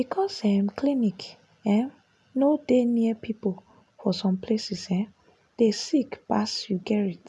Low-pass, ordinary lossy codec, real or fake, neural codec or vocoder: none; none; real; none